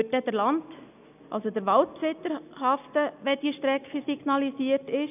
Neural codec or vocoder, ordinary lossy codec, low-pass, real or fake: none; none; 3.6 kHz; real